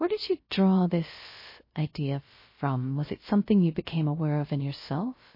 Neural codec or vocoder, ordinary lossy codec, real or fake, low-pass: codec, 16 kHz, 0.3 kbps, FocalCodec; MP3, 24 kbps; fake; 5.4 kHz